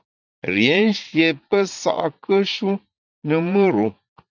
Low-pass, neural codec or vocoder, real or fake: 7.2 kHz; vocoder, 44.1 kHz, 80 mel bands, Vocos; fake